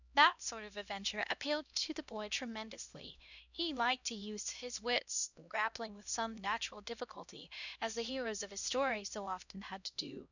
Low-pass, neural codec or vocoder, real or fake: 7.2 kHz; codec, 16 kHz, 1 kbps, X-Codec, HuBERT features, trained on LibriSpeech; fake